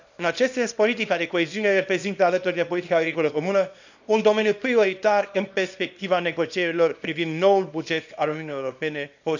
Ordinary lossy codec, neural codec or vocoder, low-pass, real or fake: none; codec, 24 kHz, 0.9 kbps, WavTokenizer, small release; 7.2 kHz; fake